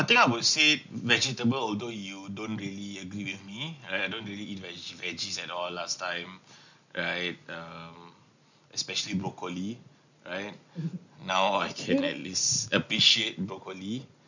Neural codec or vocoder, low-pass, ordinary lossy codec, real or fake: none; 7.2 kHz; none; real